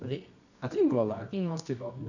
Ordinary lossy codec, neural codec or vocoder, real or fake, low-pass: none; codec, 24 kHz, 0.9 kbps, WavTokenizer, medium music audio release; fake; 7.2 kHz